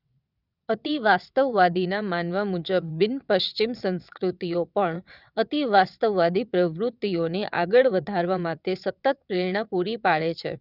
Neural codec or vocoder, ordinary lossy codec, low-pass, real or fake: vocoder, 22.05 kHz, 80 mel bands, WaveNeXt; none; 5.4 kHz; fake